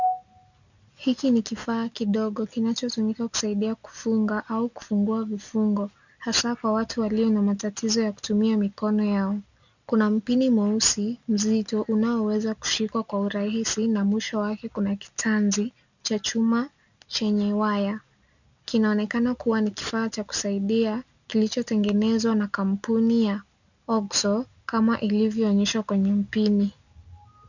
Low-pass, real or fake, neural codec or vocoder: 7.2 kHz; real; none